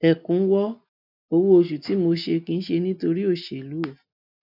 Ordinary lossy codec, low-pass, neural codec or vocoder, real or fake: none; 5.4 kHz; none; real